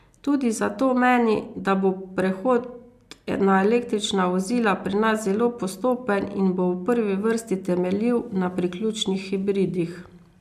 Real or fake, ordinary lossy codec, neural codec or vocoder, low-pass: real; MP3, 96 kbps; none; 14.4 kHz